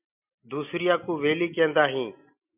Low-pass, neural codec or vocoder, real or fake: 3.6 kHz; none; real